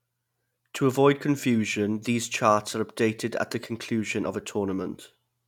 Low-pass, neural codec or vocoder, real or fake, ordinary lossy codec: 19.8 kHz; none; real; none